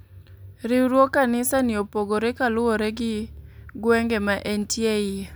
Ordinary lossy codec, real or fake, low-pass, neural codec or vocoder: none; real; none; none